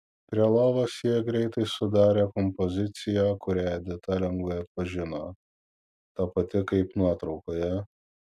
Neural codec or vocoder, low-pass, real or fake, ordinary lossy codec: none; 14.4 kHz; real; MP3, 96 kbps